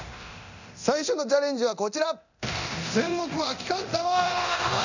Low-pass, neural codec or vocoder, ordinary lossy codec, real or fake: 7.2 kHz; codec, 24 kHz, 0.9 kbps, DualCodec; none; fake